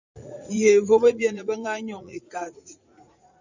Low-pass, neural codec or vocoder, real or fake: 7.2 kHz; vocoder, 44.1 kHz, 80 mel bands, Vocos; fake